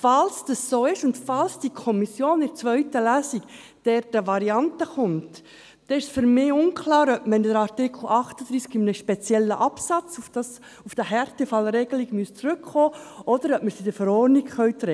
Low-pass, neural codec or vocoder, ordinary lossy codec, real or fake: none; none; none; real